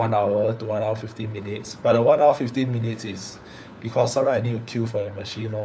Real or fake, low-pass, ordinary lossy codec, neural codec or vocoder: fake; none; none; codec, 16 kHz, 4 kbps, FunCodec, trained on LibriTTS, 50 frames a second